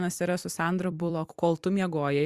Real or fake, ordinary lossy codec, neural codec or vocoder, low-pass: fake; Opus, 64 kbps; vocoder, 44.1 kHz, 128 mel bands every 256 samples, BigVGAN v2; 14.4 kHz